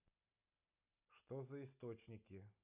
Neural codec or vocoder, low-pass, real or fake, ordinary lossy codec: none; 3.6 kHz; real; none